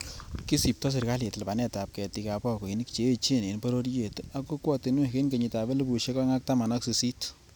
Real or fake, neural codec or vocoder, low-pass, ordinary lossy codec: real; none; none; none